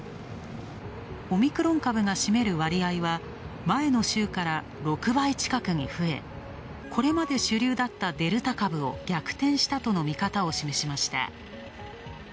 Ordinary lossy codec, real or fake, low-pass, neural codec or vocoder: none; real; none; none